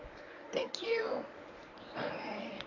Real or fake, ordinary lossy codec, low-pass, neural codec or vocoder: fake; none; 7.2 kHz; codec, 24 kHz, 0.9 kbps, WavTokenizer, medium speech release version 1